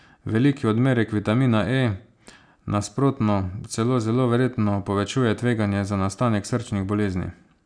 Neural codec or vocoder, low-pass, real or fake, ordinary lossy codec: none; 9.9 kHz; real; none